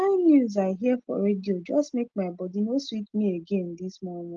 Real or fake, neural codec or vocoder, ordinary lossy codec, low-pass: real; none; Opus, 24 kbps; 7.2 kHz